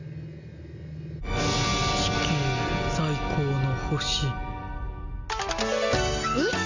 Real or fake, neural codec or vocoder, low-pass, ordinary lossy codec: real; none; 7.2 kHz; none